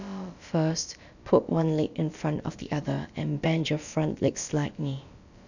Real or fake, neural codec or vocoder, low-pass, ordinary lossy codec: fake; codec, 16 kHz, about 1 kbps, DyCAST, with the encoder's durations; 7.2 kHz; none